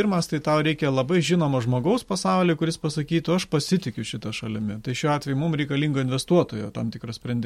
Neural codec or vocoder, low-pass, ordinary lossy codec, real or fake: none; 19.8 kHz; MP3, 64 kbps; real